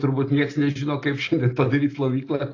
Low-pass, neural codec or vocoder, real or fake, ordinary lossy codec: 7.2 kHz; none; real; AAC, 32 kbps